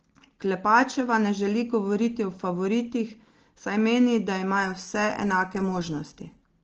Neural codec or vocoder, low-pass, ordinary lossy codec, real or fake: none; 7.2 kHz; Opus, 16 kbps; real